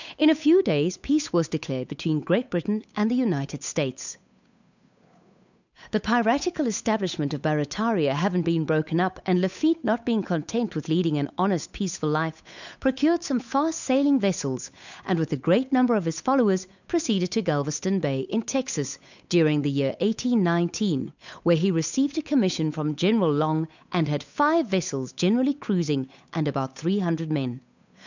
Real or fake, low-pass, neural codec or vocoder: fake; 7.2 kHz; codec, 16 kHz, 8 kbps, FunCodec, trained on Chinese and English, 25 frames a second